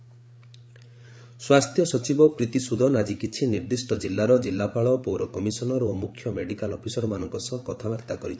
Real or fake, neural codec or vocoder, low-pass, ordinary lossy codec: fake; codec, 16 kHz, 8 kbps, FreqCodec, larger model; none; none